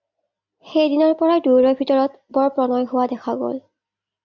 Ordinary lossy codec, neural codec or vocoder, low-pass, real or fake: MP3, 64 kbps; none; 7.2 kHz; real